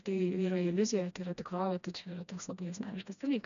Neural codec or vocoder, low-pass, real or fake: codec, 16 kHz, 1 kbps, FreqCodec, smaller model; 7.2 kHz; fake